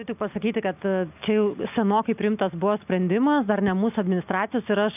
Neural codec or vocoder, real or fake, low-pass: none; real; 3.6 kHz